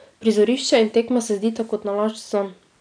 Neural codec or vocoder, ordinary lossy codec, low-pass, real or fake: none; none; 9.9 kHz; real